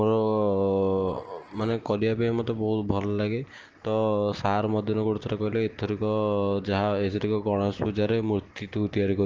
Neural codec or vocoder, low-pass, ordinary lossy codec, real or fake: none; 7.2 kHz; Opus, 16 kbps; real